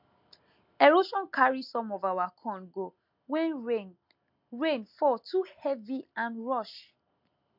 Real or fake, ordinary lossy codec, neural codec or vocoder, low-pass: real; MP3, 48 kbps; none; 5.4 kHz